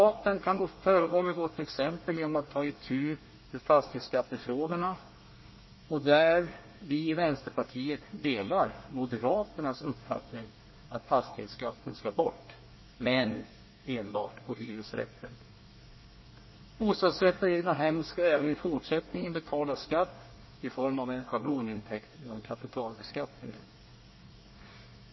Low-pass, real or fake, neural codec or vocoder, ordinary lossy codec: 7.2 kHz; fake; codec, 24 kHz, 1 kbps, SNAC; MP3, 24 kbps